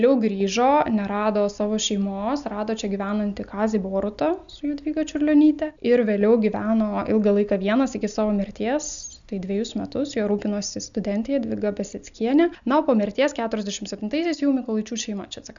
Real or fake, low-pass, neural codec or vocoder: real; 7.2 kHz; none